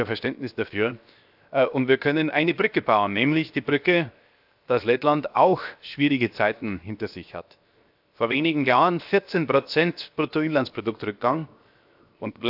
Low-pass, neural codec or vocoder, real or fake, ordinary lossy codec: 5.4 kHz; codec, 16 kHz, 0.7 kbps, FocalCodec; fake; AAC, 48 kbps